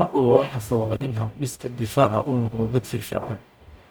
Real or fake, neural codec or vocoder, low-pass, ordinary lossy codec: fake; codec, 44.1 kHz, 0.9 kbps, DAC; none; none